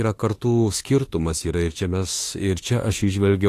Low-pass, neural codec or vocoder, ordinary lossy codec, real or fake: 14.4 kHz; autoencoder, 48 kHz, 32 numbers a frame, DAC-VAE, trained on Japanese speech; AAC, 48 kbps; fake